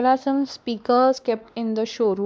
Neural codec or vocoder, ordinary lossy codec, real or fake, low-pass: codec, 16 kHz, 2 kbps, X-Codec, WavLM features, trained on Multilingual LibriSpeech; none; fake; none